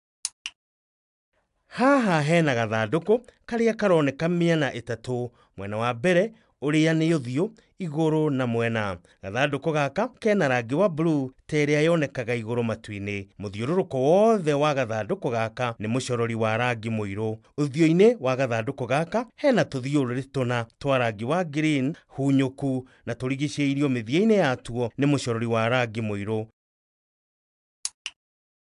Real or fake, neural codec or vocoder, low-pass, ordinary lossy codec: real; none; 10.8 kHz; AAC, 96 kbps